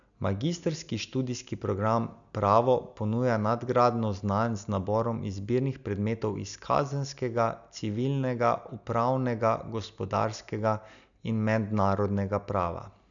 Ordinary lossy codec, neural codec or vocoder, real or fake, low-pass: none; none; real; 7.2 kHz